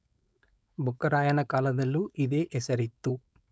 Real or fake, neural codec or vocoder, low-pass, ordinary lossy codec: fake; codec, 16 kHz, 4.8 kbps, FACodec; none; none